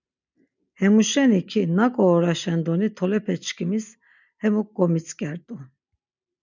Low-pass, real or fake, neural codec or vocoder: 7.2 kHz; real; none